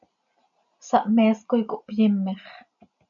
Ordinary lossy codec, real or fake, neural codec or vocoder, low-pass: MP3, 96 kbps; real; none; 7.2 kHz